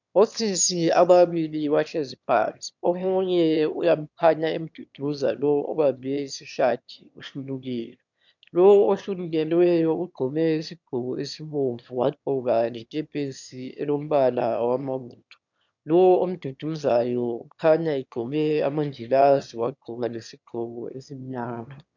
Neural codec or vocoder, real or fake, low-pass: autoencoder, 22.05 kHz, a latent of 192 numbers a frame, VITS, trained on one speaker; fake; 7.2 kHz